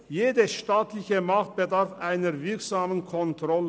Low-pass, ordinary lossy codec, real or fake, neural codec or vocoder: none; none; real; none